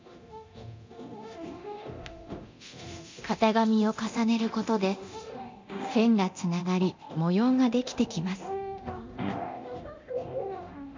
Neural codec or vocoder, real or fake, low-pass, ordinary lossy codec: codec, 24 kHz, 0.9 kbps, DualCodec; fake; 7.2 kHz; MP3, 64 kbps